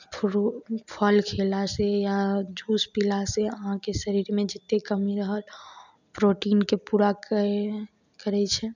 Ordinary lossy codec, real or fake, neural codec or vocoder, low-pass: none; real; none; 7.2 kHz